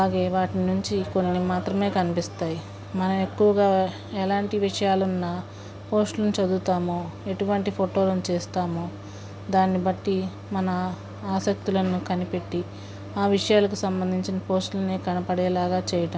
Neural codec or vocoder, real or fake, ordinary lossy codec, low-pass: none; real; none; none